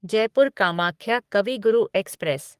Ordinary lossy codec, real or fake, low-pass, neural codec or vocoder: Opus, 32 kbps; fake; 14.4 kHz; codec, 44.1 kHz, 3.4 kbps, Pupu-Codec